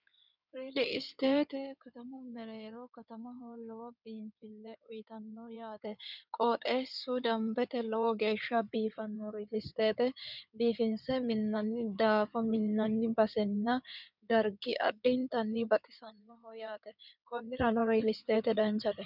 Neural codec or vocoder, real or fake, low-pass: codec, 16 kHz in and 24 kHz out, 2.2 kbps, FireRedTTS-2 codec; fake; 5.4 kHz